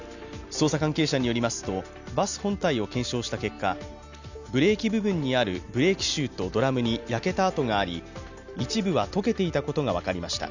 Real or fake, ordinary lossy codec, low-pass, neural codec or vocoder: real; none; 7.2 kHz; none